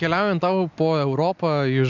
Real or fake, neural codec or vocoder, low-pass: real; none; 7.2 kHz